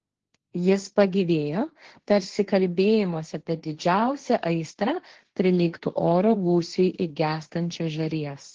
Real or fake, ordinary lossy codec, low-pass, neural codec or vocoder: fake; Opus, 16 kbps; 7.2 kHz; codec, 16 kHz, 1.1 kbps, Voila-Tokenizer